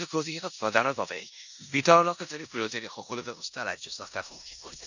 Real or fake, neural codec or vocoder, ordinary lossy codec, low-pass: fake; codec, 16 kHz in and 24 kHz out, 0.9 kbps, LongCat-Audio-Codec, four codebook decoder; none; 7.2 kHz